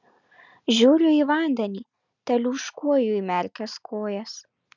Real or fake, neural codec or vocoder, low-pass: real; none; 7.2 kHz